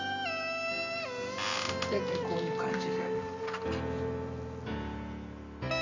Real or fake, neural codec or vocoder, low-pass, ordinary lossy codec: real; none; 7.2 kHz; none